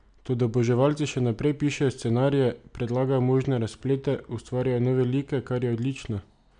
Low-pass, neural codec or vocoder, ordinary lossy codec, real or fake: 9.9 kHz; none; MP3, 96 kbps; real